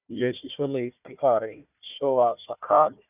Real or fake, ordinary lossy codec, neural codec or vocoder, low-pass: fake; none; codec, 16 kHz, 1 kbps, FunCodec, trained on Chinese and English, 50 frames a second; 3.6 kHz